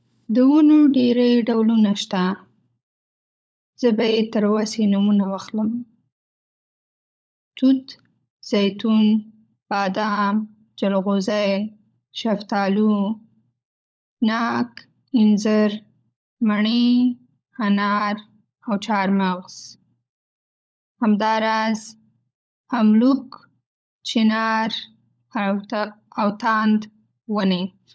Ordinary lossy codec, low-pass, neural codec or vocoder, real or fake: none; none; codec, 16 kHz, 16 kbps, FunCodec, trained on LibriTTS, 50 frames a second; fake